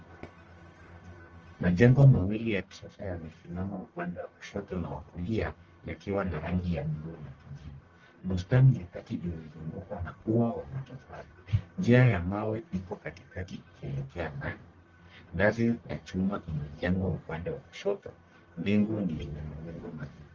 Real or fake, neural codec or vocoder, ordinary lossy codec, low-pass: fake; codec, 44.1 kHz, 1.7 kbps, Pupu-Codec; Opus, 24 kbps; 7.2 kHz